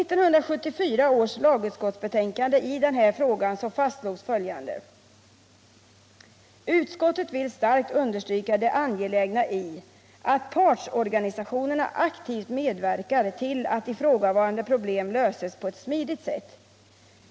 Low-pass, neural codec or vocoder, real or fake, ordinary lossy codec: none; none; real; none